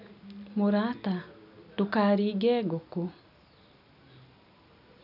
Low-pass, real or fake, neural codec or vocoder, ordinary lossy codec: 5.4 kHz; real; none; none